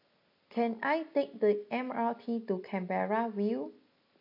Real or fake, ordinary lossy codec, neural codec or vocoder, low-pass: real; AAC, 32 kbps; none; 5.4 kHz